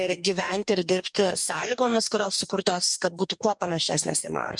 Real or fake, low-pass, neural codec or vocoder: fake; 10.8 kHz; codec, 44.1 kHz, 2.6 kbps, DAC